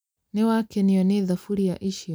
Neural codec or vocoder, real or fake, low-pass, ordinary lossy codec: none; real; none; none